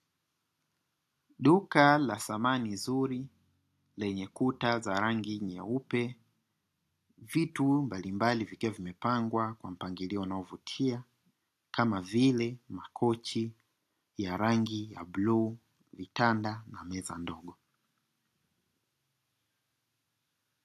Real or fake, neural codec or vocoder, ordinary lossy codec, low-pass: real; none; AAC, 64 kbps; 14.4 kHz